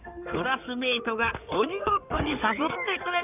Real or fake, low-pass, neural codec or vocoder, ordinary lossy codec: fake; 3.6 kHz; codec, 16 kHz in and 24 kHz out, 2.2 kbps, FireRedTTS-2 codec; none